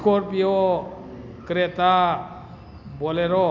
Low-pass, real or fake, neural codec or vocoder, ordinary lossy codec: 7.2 kHz; real; none; none